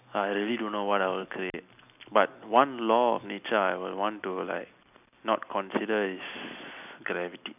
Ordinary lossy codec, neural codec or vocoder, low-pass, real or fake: none; none; 3.6 kHz; real